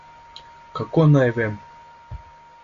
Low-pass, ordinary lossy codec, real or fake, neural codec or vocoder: 7.2 kHz; Opus, 64 kbps; real; none